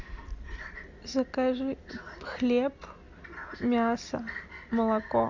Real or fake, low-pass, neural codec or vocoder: real; 7.2 kHz; none